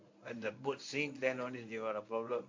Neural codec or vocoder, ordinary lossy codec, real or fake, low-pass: codec, 24 kHz, 0.9 kbps, WavTokenizer, medium speech release version 1; MP3, 48 kbps; fake; 7.2 kHz